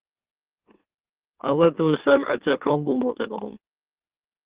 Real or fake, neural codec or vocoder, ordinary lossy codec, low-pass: fake; autoencoder, 44.1 kHz, a latent of 192 numbers a frame, MeloTTS; Opus, 16 kbps; 3.6 kHz